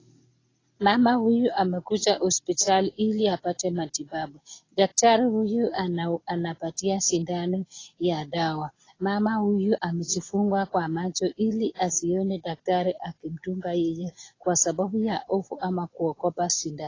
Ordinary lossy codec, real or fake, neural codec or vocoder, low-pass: AAC, 32 kbps; real; none; 7.2 kHz